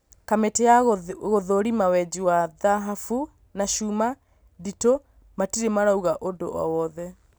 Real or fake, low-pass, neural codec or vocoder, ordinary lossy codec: real; none; none; none